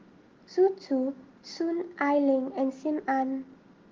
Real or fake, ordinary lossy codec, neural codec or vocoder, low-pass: real; Opus, 16 kbps; none; 7.2 kHz